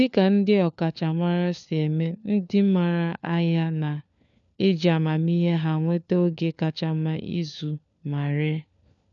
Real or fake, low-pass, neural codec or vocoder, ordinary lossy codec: fake; 7.2 kHz; codec, 16 kHz, 4 kbps, FunCodec, trained on LibriTTS, 50 frames a second; none